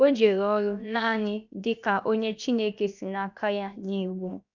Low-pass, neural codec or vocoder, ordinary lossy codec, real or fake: 7.2 kHz; codec, 16 kHz, 0.7 kbps, FocalCodec; none; fake